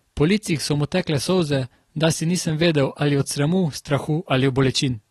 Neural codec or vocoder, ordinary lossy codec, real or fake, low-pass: none; AAC, 32 kbps; real; 19.8 kHz